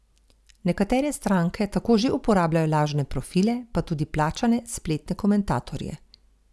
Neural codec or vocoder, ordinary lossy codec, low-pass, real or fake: none; none; none; real